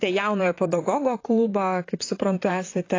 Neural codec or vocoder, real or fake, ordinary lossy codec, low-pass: vocoder, 44.1 kHz, 128 mel bands, Pupu-Vocoder; fake; AAC, 32 kbps; 7.2 kHz